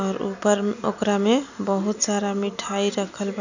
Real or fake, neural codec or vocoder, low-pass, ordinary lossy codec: real; none; 7.2 kHz; none